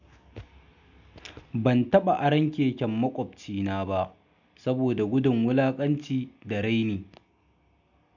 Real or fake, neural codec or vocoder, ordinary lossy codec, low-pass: real; none; none; 7.2 kHz